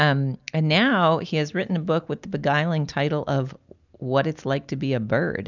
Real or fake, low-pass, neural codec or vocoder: real; 7.2 kHz; none